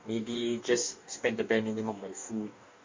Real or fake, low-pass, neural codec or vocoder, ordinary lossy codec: fake; 7.2 kHz; codec, 44.1 kHz, 2.6 kbps, DAC; MP3, 48 kbps